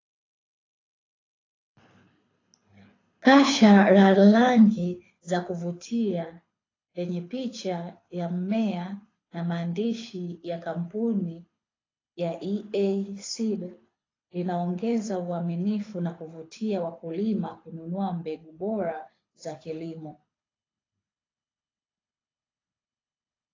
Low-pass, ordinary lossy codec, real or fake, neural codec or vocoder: 7.2 kHz; AAC, 32 kbps; fake; codec, 24 kHz, 6 kbps, HILCodec